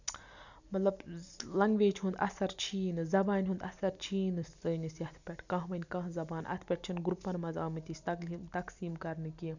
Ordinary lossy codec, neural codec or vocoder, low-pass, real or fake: none; none; 7.2 kHz; real